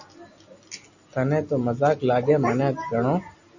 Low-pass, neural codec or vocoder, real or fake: 7.2 kHz; none; real